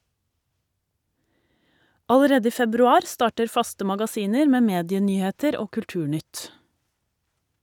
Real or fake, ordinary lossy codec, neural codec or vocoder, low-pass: real; none; none; 19.8 kHz